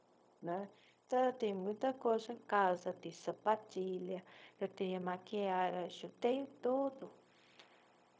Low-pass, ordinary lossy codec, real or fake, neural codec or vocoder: none; none; fake; codec, 16 kHz, 0.4 kbps, LongCat-Audio-Codec